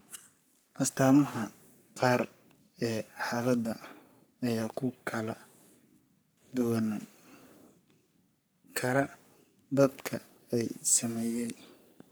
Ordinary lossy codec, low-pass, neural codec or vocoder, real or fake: none; none; codec, 44.1 kHz, 3.4 kbps, Pupu-Codec; fake